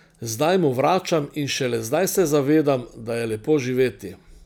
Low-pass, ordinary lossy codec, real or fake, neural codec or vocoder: none; none; real; none